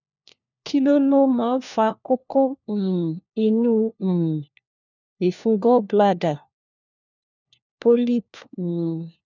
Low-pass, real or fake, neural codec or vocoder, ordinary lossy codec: 7.2 kHz; fake; codec, 16 kHz, 1 kbps, FunCodec, trained on LibriTTS, 50 frames a second; none